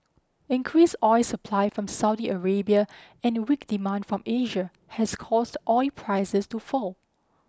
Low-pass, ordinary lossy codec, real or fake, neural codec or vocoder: none; none; real; none